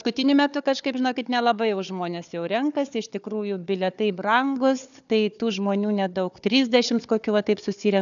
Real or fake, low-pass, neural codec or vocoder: fake; 7.2 kHz; codec, 16 kHz, 4 kbps, FunCodec, trained on Chinese and English, 50 frames a second